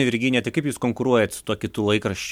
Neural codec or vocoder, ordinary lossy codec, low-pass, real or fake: none; MP3, 96 kbps; 19.8 kHz; real